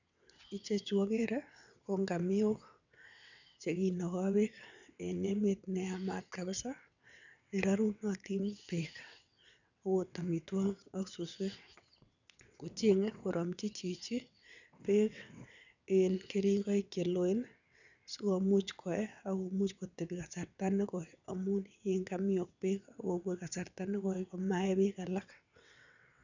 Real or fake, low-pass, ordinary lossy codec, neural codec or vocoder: fake; 7.2 kHz; none; vocoder, 22.05 kHz, 80 mel bands, WaveNeXt